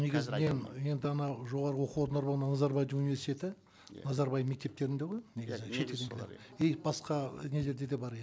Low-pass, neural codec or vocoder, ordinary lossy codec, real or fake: none; none; none; real